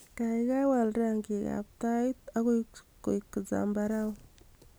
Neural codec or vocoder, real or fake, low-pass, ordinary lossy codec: none; real; none; none